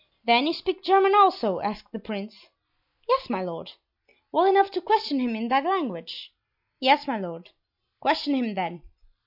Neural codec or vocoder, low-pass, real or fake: none; 5.4 kHz; real